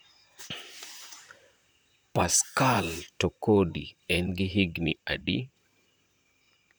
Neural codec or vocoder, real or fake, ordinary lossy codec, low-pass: vocoder, 44.1 kHz, 128 mel bands, Pupu-Vocoder; fake; none; none